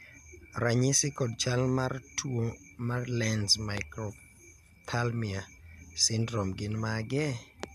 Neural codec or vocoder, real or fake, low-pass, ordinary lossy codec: none; real; 14.4 kHz; MP3, 96 kbps